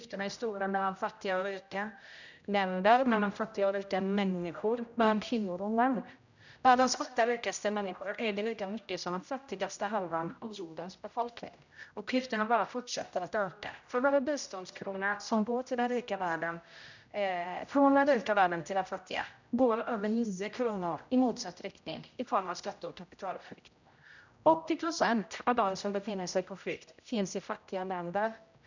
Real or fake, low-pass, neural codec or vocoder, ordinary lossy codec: fake; 7.2 kHz; codec, 16 kHz, 0.5 kbps, X-Codec, HuBERT features, trained on general audio; none